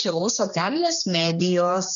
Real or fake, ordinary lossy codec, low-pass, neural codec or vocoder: fake; MP3, 96 kbps; 7.2 kHz; codec, 16 kHz, 2 kbps, X-Codec, HuBERT features, trained on general audio